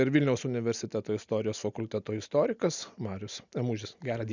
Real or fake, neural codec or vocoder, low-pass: real; none; 7.2 kHz